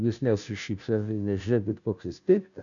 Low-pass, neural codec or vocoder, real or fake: 7.2 kHz; codec, 16 kHz, 0.5 kbps, FunCodec, trained on Chinese and English, 25 frames a second; fake